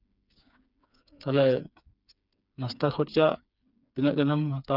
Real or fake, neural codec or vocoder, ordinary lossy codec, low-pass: fake; codec, 16 kHz, 4 kbps, FreqCodec, smaller model; none; 5.4 kHz